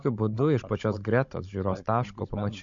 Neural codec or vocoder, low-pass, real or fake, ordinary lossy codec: codec, 16 kHz, 16 kbps, FunCodec, trained on Chinese and English, 50 frames a second; 7.2 kHz; fake; MP3, 48 kbps